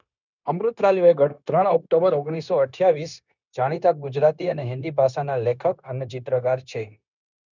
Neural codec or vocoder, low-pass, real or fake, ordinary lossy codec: codec, 16 kHz, 0.9 kbps, LongCat-Audio-Codec; 7.2 kHz; fake; none